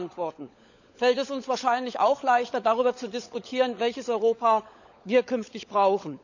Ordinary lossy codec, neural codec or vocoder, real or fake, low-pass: none; codec, 16 kHz, 16 kbps, FunCodec, trained on LibriTTS, 50 frames a second; fake; 7.2 kHz